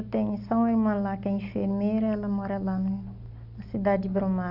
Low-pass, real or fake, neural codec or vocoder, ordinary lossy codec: 5.4 kHz; real; none; none